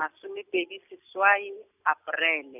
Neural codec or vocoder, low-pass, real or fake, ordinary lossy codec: none; 3.6 kHz; real; none